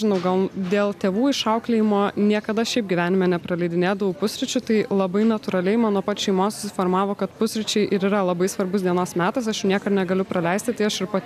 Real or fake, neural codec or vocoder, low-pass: real; none; 14.4 kHz